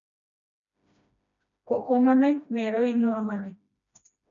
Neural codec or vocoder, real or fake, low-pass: codec, 16 kHz, 1 kbps, FreqCodec, smaller model; fake; 7.2 kHz